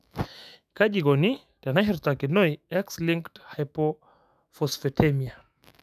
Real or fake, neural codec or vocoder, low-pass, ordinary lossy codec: fake; autoencoder, 48 kHz, 128 numbers a frame, DAC-VAE, trained on Japanese speech; 14.4 kHz; none